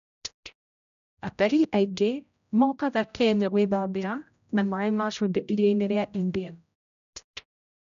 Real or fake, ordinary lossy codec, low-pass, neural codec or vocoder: fake; none; 7.2 kHz; codec, 16 kHz, 0.5 kbps, X-Codec, HuBERT features, trained on general audio